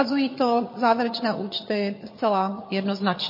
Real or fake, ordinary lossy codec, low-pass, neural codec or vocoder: fake; MP3, 24 kbps; 5.4 kHz; vocoder, 22.05 kHz, 80 mel bands, HiFi-GAN